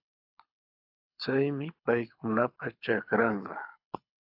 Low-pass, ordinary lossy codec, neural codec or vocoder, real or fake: 5.4 kHz; AAC, 48 kbps; codec, 24 kHz, 6 kbps, HILCodec; fake